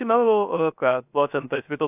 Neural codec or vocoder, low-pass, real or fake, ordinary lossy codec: codec, 16 kHz, 0.3 kbps, FocalCodec; 3.6 kHz; fake; AAC, 32 kbps